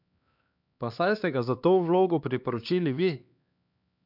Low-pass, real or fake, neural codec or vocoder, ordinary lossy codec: 5.4 kHz; fake; codec, 16 kHz, 2 kbps, X-Codec, HuBERT features, trained on LibriSpeech; none